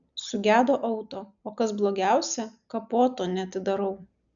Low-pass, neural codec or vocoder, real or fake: 7.2 kHz; none; real